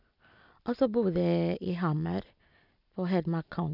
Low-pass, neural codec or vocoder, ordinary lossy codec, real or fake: 5.4 kHz; none; none; real